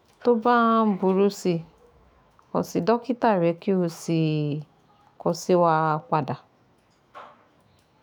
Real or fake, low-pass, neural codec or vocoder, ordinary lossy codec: fake; none; autoencoder, 48 kHz, 128 numbers a frame, DAC-VAE, trained on Japanese speech; none